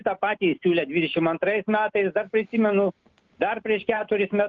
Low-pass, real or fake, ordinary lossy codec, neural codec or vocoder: 7.2 kHz; real; Opus, 32 kbps; none